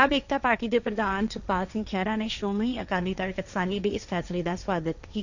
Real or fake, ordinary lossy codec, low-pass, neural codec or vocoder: fake; none; 7.2 kHz; codec, 16 kHz, 1.1 kbps, Voila-Tokenizer